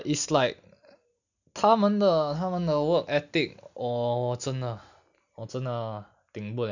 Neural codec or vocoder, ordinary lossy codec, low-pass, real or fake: none; none; 7.2 kHz; real